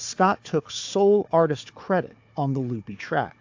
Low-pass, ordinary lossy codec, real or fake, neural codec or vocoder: 7.2 kHz; AAC, 48 kbps; fake; codec, 16 kHz, 4 kbps, FunCodec, trained on Chinese and English, 50 frames a second